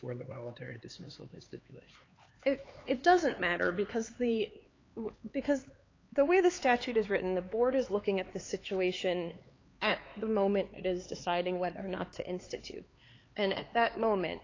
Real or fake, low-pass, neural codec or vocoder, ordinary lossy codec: fake; 7.2 kHz; codec, 16 kHz, 4 kbps, X-Codec, HuBERT features, trained on LibriSpeech; AAC, 32 kbps